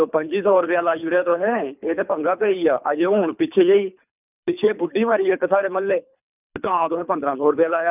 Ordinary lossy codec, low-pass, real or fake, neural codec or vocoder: none; 3.6 kHz; fake; codec, 24 kHz, 3 kbps, HILCodec